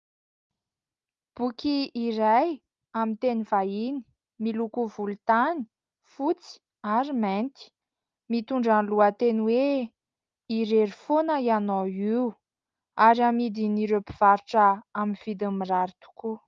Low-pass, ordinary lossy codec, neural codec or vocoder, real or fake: 7.2 kHz; Opus, 32 kbps; none; real